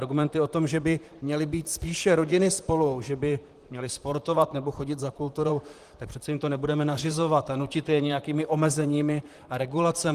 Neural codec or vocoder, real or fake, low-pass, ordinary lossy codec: vocoder, 44.1 kHz, 128 mel bands, Pupu-Vocoder; fake; 14.4 kHz; Opus, 24 kbps